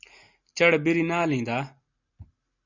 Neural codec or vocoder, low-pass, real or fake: none; 7.2 kHz; real